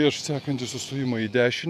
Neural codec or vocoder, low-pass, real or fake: vocoder, 48 kHz, 128 mel bands, Vocos; 14.4 kHz; fake